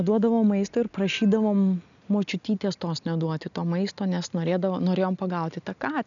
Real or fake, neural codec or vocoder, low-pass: real; none; 7.2 kHz